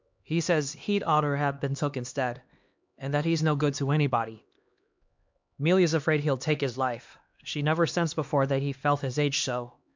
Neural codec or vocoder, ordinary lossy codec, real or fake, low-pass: codec, 16 kHz, 2 kbps, X-Codec, HuBERT features, trained on LibriSpeech; MP3, 64 kbps; fake; 7.2 kHz